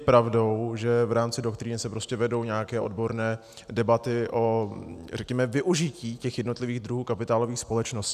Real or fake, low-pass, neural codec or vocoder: real; 14.4 kHz; none